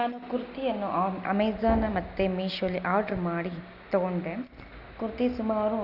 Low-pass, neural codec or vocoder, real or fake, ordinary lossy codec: 5.4 kHz; none; real; Opus, 64 kbps